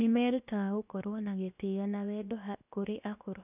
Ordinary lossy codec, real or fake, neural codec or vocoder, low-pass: none; fake; codec, 16 kHz, 0.7 kbps, FocalCodec; 3.6 kHz